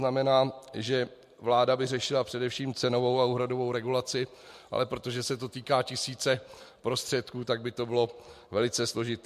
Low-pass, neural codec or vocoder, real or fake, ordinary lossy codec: 14.4 kHz; none; real; MP3, 64 kbps